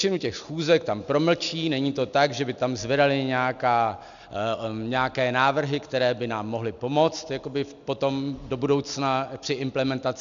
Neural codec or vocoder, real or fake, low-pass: none; real; 7.2 kHz